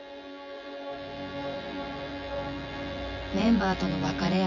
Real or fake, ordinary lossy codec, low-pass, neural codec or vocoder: fake; none; 7.2 kHz; vocoder, 24 kHz, 100 mel bands, Vocos